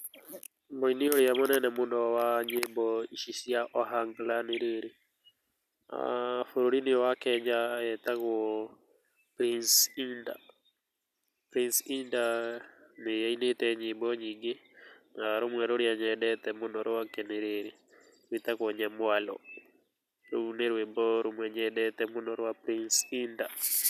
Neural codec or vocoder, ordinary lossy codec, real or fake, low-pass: none; none; real; 14.4 kHz